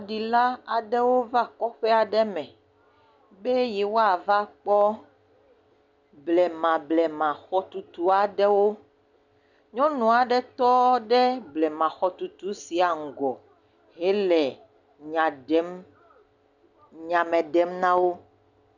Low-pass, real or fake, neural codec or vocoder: 7.2 kHz; real; none